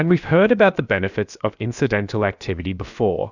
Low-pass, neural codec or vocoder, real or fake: 7.2 kHz; codec, 16 kHz, about 1 kbps, DyCAST, with the encoder's durations; fake